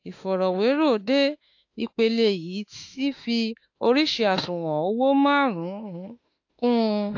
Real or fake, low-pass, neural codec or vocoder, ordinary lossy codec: fake; 7.2 kHz; autoencoder, 48 kHz, 32 numbers a frame, DAC-VAE, trained on Japanese speech; AAC, 48 kbps